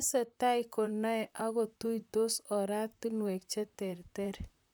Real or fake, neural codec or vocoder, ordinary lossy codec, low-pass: fake; vocoder, 44.1 kHz, 128 mel bands, Pupu-Vocoder; none; none